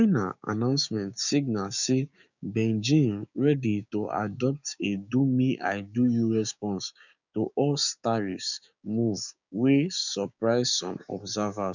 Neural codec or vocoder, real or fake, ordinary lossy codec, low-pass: codec, 16 kHz, 6 kbps, DAC; fake; none; 7.2 kHz